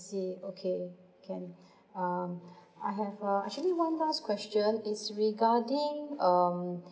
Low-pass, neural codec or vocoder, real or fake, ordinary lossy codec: none; none; real; none